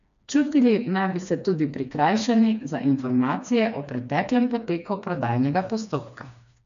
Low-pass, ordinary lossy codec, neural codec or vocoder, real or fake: 7.2 kHz; none; codec, 16 kHz, 2 kbps, FreqCodec, smaller model; fake